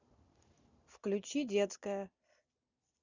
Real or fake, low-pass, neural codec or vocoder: real; 7.2 kHz; none